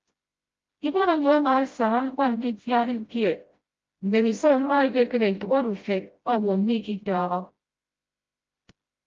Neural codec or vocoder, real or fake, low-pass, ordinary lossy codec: codec, 16 kHz, 0.5 kbps, FreqCodec, smaller model; fake; 7.2 kHz; Opus, 32 kbps